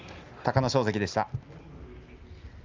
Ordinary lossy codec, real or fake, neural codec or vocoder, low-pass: Opus, 32 kbps; real; none; 7.2 kHz